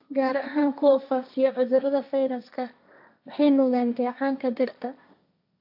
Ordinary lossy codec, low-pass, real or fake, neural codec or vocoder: AAC, 48 kbps; 5.4 kHz; fake; codec, 16 kHz, 1.1 kbps, Voila-Tokenizer